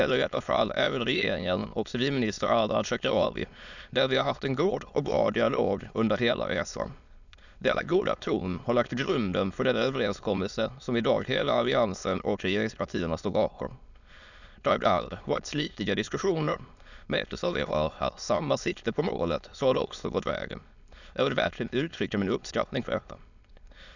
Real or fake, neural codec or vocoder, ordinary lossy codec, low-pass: fake; autoencoder, 22.05 kHz, a latent of 192 numbers a frame, VITS, trained on many speakers; none; 7.2 kHz